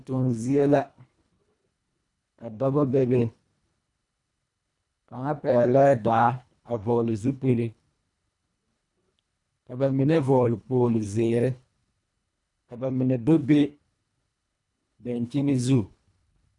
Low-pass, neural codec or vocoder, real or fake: 10.8 kHz; codec, 24 kHz, 1.5 kbps, HILCodec; fake